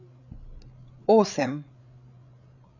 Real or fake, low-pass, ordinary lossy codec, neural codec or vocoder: fake; 7.2 kHz; none; codec, 16 kHz, 8 kbps, FreqCodec, larger model